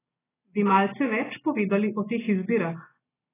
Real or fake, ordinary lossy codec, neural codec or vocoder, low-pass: real; AAC, 16 kbps; none; 3.6 kHz